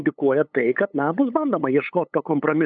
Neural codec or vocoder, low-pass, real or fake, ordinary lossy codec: codec, 16 kHz, 8 kbps, FunCodec, trained on LibriTTS, 25 frames a second; 7.2 kHz; fake; MP3, 96 kbps